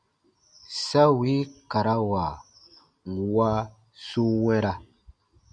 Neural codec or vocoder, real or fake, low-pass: none; real; 9.9 kHz